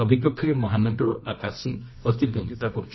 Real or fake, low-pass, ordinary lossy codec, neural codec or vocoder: fake; 7.2 kHz; MP3, 24 kbps; codec, 24 kHz, 0.9 kbps, WavTokenizer, medium music audio release